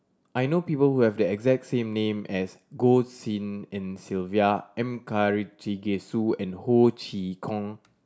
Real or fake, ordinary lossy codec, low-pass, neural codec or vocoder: real; none; none; none